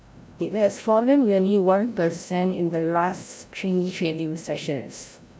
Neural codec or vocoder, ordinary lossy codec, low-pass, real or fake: codec, 16 kHz, 0.5 kbps, FreqCodec, larger model; none; none; fake